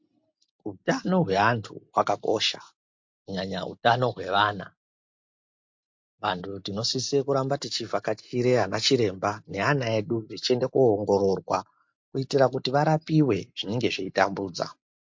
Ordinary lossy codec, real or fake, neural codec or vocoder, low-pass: MP3, 48 kbps; real; none; 7.2 kHz